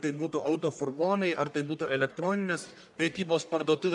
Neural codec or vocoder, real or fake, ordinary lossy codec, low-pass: codec, 44.1 kHz, 1.7 kbps, Pupu-Codec; fake; MP3, 96 kbps; 10.8 kHz